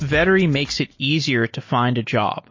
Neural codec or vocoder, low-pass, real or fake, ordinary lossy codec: none; 7.2 kHz; real; MP3, 32 kbps